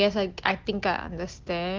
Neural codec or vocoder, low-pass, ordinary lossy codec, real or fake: none; 7.2 kHz; Opus, 16 kbps; real